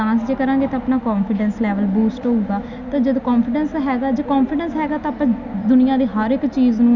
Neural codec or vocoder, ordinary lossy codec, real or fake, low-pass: none; none; real; 7.2 kHz